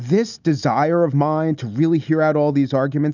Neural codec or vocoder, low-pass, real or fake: none; 7.2 kHz; real